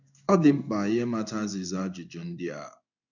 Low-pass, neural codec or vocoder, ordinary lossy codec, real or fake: 7.2 kHz; codec, 16 kHz in and 24 kHz out, 1 kbps, XY-Tokenizer; none; fake